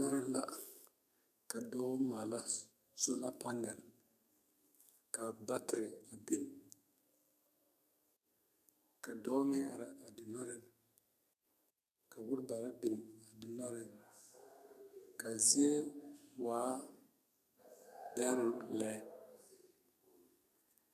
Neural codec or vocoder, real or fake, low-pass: codec, 32 kHz, 1.9 kbps, SNAC; fake; 14.4 kHz